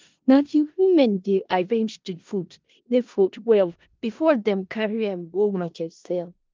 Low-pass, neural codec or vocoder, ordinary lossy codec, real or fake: 7.2 kHz; codec, 16 kHz in and 24 kHz out, 0.4 kbps, LongCat-Audio-Codec, four codebook decoder; Opus, 24 kbps; fake